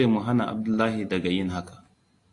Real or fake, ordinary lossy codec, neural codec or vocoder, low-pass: real; AAC, 48 kbps; none; 10.8 kHz